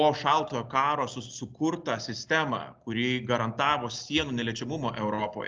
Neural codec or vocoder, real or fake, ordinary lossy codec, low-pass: none; real; Opus, 24 kbps; 7.2 kHz